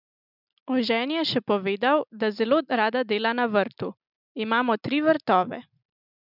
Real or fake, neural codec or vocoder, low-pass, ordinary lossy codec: real; none; 5.4 kHz; none